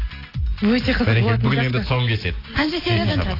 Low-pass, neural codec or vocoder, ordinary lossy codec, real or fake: 5.4 kHz; none; AAC, 24 kbps; real